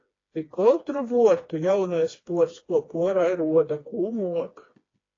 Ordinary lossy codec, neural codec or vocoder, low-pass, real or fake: AAC, 32 kbps; codec, 16 kHz, 2 kbps, FreqCodec, smaller model; 7.2 kHz; fake